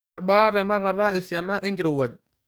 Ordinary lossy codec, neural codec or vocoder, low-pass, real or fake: none; codec, 44.1 kHz, 2.6 kbps, DAC; none; fake